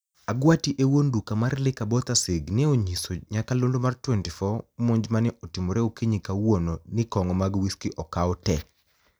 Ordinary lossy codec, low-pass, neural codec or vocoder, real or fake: none; none; none; real